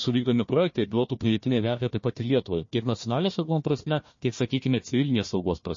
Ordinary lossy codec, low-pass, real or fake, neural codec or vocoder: MP3, 32 kbps; 7.2 kHz; fake; codec, 16 kHz, 1 kbps, FunCodec, trained on Chinese and English, 50 frames a second